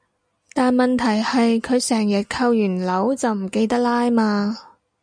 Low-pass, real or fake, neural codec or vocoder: 9.9 kHz; real; none